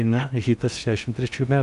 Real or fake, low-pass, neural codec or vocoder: fake; 10.8 kHz; codec, 16 kHz in and 24 kHz out, 0.8 kbps, FocalCodec, streaming, 65536 codes